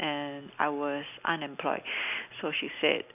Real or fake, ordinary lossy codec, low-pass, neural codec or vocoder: real; none; 3.6 kHz; none